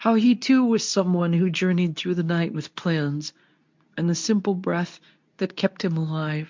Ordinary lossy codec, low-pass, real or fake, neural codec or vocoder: MP3, 64 kbps; 7.2 kHz; fake; codec, 24 kHz, 0.9 kbps, WavTokenizer, medium speech release version 2